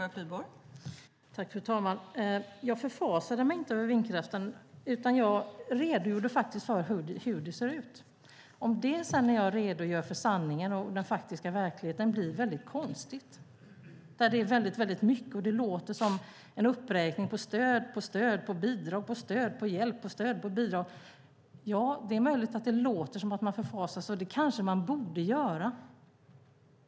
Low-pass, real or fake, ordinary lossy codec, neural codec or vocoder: none; real; none; none